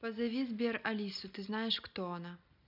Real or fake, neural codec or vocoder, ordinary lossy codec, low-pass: real; none; none; 5.4 kHz